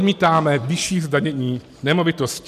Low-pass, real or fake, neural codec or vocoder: 14.4 kHz; real; none